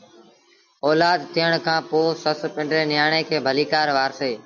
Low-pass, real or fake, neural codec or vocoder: 7.2 kHz; real; none